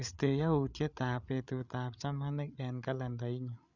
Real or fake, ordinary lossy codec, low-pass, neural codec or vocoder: fake; none; 7.2 kHz; codec, 16 kHz, 8 kbps, FreqCodec, larger model